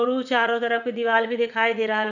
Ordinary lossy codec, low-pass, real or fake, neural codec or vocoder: none; 7.2 kHz; fake; vocoder, 44.1 kHz, 80 mel bands, Vocos